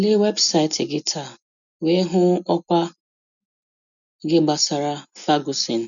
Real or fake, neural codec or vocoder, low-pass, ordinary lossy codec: real; none; 7.2 kHz; none